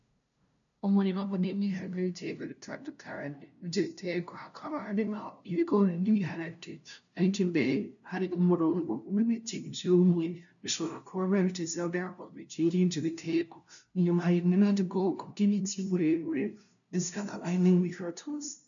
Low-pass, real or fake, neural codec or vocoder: 7.2 kHz; fake; codec, 16 kHz, 0.5 kbps, FunCodec, trained on LibriTTS, 25 frames a second